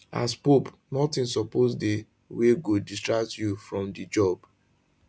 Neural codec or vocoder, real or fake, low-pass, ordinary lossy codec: none; real; none; none